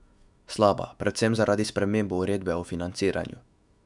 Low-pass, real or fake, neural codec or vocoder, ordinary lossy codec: 10.8 kHz; fake; autoencoder, 48 kHz, 128 numbers a frame, DAC-VAE, trained on Japanese speech; none